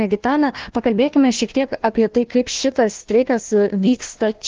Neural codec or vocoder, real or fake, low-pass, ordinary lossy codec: codec, 16 kHz, 1 kbps, FunCodec, trained on Chinese and English, 50 frames a second; fake; 7.2 kHz; Opus, 16 kbps